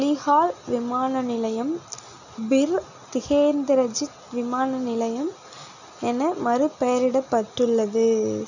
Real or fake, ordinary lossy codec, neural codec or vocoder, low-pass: real; none; none; 7.2 kHz